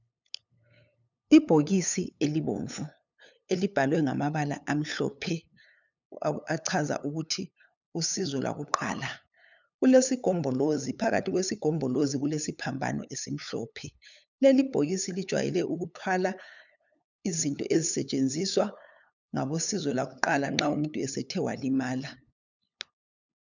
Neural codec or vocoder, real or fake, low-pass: codec, 16 kHz, 8 kbps, FunCodec, trained on LibriTTS, 25 frames a second; fake; 7.2 kHz